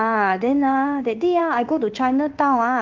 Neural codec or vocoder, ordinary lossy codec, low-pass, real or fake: codec, 16 kHz in and 24 kHz out, 1 kbps, XY-Tokenizer; Opus, 32 kbps; 7.2 kHz; fake